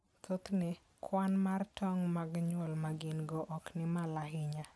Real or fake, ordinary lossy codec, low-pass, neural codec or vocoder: real; none; none; none